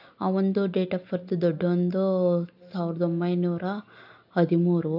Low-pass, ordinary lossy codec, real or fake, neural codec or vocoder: 5.4 kHz; AAC, 48 kbps; real; none